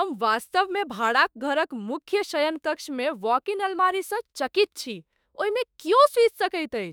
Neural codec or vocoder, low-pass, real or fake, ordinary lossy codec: autoencoder, 48 kHz, 32 numbers a frame, DAC-VAE, trained on Japanese speech; none; fake; none